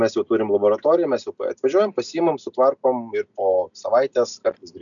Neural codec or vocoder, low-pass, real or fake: none; 7.2 kHz; real